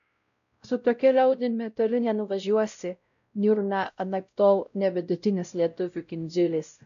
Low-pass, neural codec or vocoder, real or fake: 7.2 kHz; codec, 16 kHz, 0.5 kbps, X-Codec, WavLM features, trained on Multilingual LibriSpeech; fake